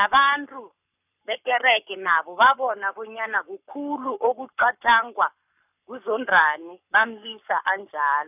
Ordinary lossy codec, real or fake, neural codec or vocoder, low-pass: none; fake; codec, 44.1 kHz, 7.8 kbps, Pupu-Codec; 3.6 kHz